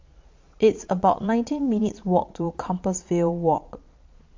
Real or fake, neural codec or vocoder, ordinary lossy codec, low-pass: fake; vocoder, 22.05 kHz, 80 mel bands, Vocos; MP3, 48 kbps; 7.2 kHz